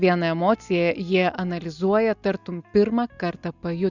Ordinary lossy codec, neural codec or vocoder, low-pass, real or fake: Opus, 64 kbps; none; 7.2 kHz; real